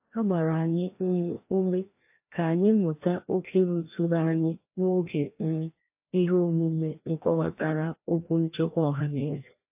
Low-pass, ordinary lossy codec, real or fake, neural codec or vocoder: 3.6 kHz; AAC, 32 kbps; fake; codec, 16 kHz, 1 kbps, FreqCodec, larger model